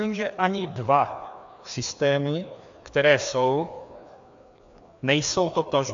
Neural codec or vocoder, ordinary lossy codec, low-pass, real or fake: codec, 16 kHz, 2 kbps, FreqCodec, larger model; AAC, 64 kbps; 7.2 kHz; fake